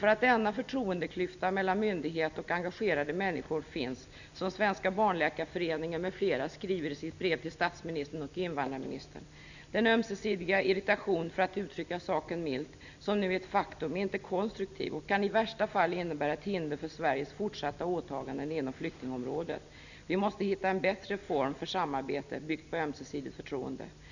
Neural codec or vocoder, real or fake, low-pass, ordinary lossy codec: none; real; 7.2 kHz; none